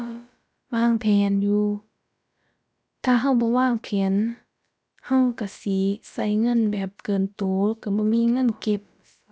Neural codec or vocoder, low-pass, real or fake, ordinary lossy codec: codec, 16 kHz, about 1 kbps, DyCAST, with the encoder's durations; none; fake; none